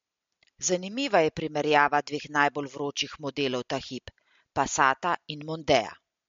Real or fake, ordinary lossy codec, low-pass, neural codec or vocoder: real; MP3, 48 kbps; 7.2 kHz; none